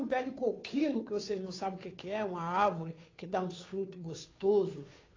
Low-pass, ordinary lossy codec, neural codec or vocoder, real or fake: 7.2 kHz; AAC, 32 kbps; codec, 16 kHz, 2 kbps, FunCodec, trained on Chinese and English, 25 frames a second; fake